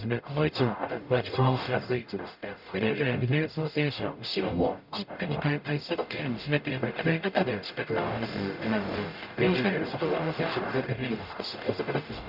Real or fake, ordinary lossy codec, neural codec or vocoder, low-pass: fake; none; codec, 44.1 kHz, 0.9 kbps, DAC; 5.4 kHz